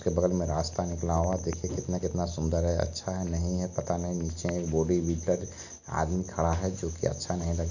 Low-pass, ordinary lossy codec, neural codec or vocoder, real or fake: 7.2 kHz; none; none; real